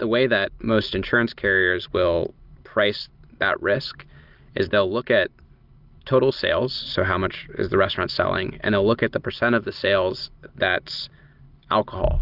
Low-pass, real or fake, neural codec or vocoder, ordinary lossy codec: 5.4 kHz; real; none; Opus, 24 kbps